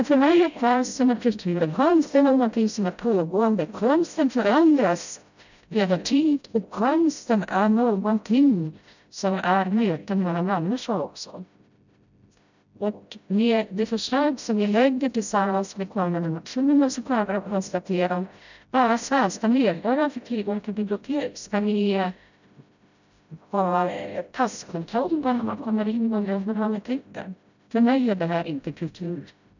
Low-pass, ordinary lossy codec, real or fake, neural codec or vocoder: 7.2 kHz; none; fake; codec, 16 kHz, 0.5 kbps, FreqCodec, smaller model